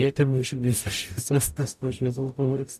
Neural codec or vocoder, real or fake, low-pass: codec, 44.1 kHz, 0.9 kbps, DAC; fake; 14.4 kHz